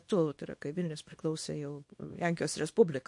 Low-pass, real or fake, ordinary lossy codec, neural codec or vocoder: 10.8 kHz; fake; MP3, 48 kbps; codec, 24 kHz, 0.9 kbps, WavTokenizer, small release